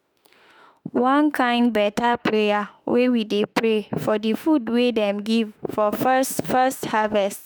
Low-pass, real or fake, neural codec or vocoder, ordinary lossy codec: none; fake; autoencoder, 48 kHz, 32 numbers a frame, DAC-VAE, trained on Japanese speech; none